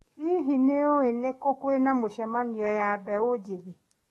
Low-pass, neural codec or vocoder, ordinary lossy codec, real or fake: 19.8 kHz; codec, 44.1 kHz, 7.8 kbps, DAC; AAC, 32 kbps; fake